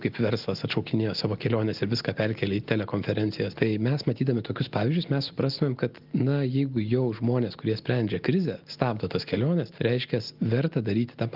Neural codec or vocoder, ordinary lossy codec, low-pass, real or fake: none; Opus, 32 kbps; 5.4 kHz; real